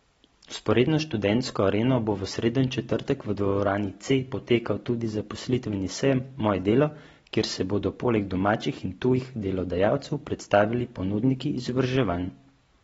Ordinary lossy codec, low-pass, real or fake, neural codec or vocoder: AAC, 24 kbps; 10.8 kHz; real; none